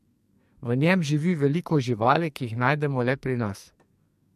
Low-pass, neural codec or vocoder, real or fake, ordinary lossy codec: 14.4 kHz; codec, 44.1 kHz, 2.6 kbps, SNAC; fake; MP3, 64 kbps